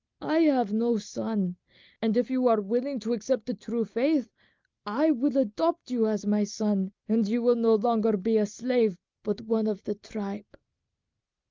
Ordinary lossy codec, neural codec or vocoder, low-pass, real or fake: Opus, 24 kbps; none; 7.2 kHz; real